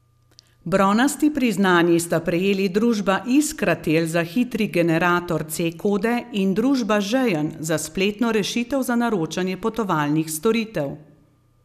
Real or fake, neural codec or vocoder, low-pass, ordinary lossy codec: real; none; 14.4 kHz; none